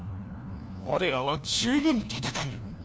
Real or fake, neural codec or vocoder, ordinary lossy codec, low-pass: fake; codec, 16 kHz, 1 kbps, FunCodec, trained on LibriTTS, 50 frames a second; none; none